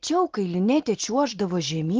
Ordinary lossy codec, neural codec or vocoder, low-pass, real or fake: Opus, 16 kbps; none; 7.2 kHz; real